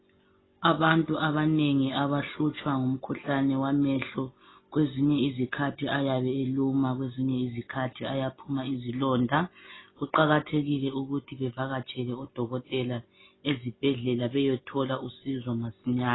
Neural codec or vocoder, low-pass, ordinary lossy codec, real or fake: none; 7.2 kHz; AAC, 16 kbps; real